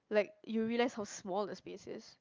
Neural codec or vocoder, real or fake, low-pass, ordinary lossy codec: none; real; 7.2 kHz; Opus, 24 kbps